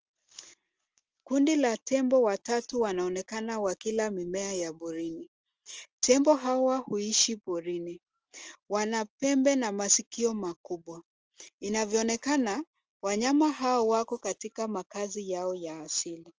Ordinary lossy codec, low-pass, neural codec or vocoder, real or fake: Opus, 24 kbps; 7.2 kHz; none; real